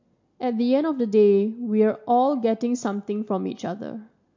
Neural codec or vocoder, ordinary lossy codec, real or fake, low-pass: none; MP3, 48 kbps; real; 7.2 kHz